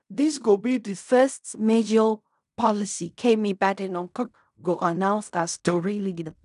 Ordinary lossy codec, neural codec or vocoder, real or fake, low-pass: none; codec, 16 kHz in and 24 kHz out, 0.4 kbps, LongCat-Audio-Codec, fine tuned four codebook decoder; fake; 10.8 kHz